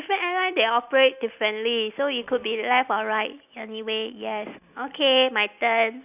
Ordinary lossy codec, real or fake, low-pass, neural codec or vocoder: none; real; 3.6 kHz; none